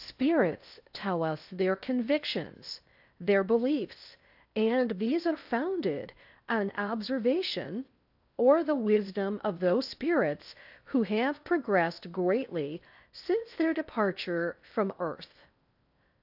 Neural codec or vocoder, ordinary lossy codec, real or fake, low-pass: codec, 16 kHz in and 24 kHz out, 0.6 kbps, FocalCodec, streaming, 2048 codes; AAC, 48 kbps; fake; 5.4 kHz